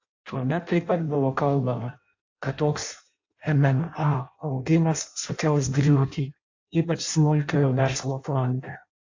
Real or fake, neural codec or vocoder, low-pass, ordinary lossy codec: fake; codec, 16 kHz in and 24 kHz out, 0.6 kbps, FireRedTTS-2 codec; 7.2 kHz; AAC, 48 kbps